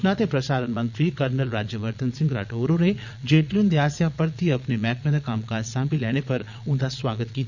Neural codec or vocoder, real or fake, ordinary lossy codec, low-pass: vocoder, 22.05 kHz, 80 mel bands, Vocos; fake; none; 7.2 kHz